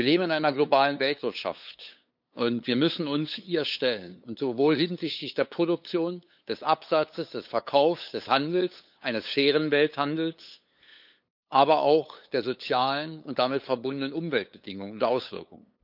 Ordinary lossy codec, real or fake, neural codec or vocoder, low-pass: none; fake; codec, 16 kHz, 8 kbps, FunCodec, trained on LibriTTS, 25 frames a second; 5.4 kHz